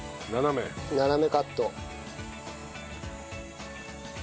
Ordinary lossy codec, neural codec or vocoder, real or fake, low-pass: none; none; real; none